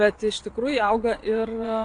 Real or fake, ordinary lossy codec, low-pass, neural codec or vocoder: fake; AAC, 48 kbps; 9.9 kHz; vocoder, 22.05 kHz, 80 mel bands, WaveNeXt